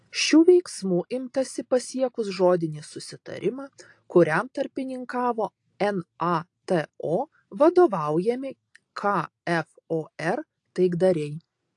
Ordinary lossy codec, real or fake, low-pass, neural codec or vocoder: AAC, 48 kbps; real; 10.8 kHz; none